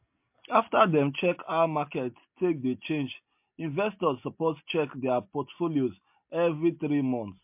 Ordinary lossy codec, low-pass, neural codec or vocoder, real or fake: MP3, 32 kbps; 3.6 kHz; none; real